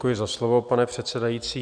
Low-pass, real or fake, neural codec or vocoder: 9.9 kHz; real; none